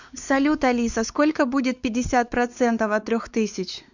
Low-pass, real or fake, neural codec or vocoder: 7.2 kHz; fake; codec, 16 kHz, 4 kbps, X-Codec, WavLM features, trained on Multilingual LibriSpeech